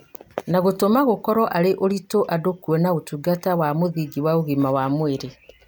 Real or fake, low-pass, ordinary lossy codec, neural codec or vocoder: real; none; none; none